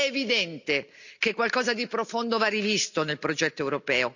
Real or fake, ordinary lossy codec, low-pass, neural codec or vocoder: real; none; 7.2 kHz; none